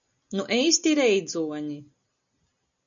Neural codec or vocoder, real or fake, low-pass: none; real; 7.2 kHz